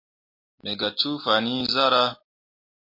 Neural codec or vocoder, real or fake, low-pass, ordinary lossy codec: none; real; 5.4 kHz; MP3, 32 kbps